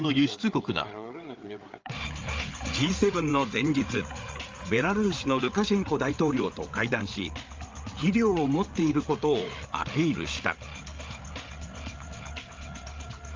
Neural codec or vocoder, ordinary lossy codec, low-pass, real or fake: codec, 16 kHz, 8 kbps, FreqCodec, larger model; Opus, 32 kbps; 7.2 kHz; fake